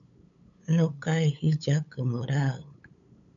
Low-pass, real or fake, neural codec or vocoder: 7.2 kHz; fake; codec, 16 kHz, 8 kbps, FunCodec, trained on LibriTTS, 25 frames a second